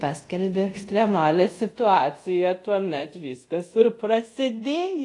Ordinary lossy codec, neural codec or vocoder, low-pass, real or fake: AAC, 48 kbps; codec, 24 kHz, 0.5 kbps, DualCodec; 10.8 kHz; fake